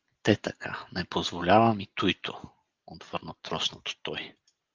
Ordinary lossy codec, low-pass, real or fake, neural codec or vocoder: Opus, 32 kbps; 7.2 kHz; real; none